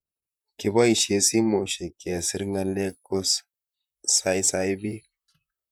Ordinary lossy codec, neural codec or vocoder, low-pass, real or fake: none; vocoder, 44.1 kHz, 128 mel bands, Pupu-Vocoder; none; fake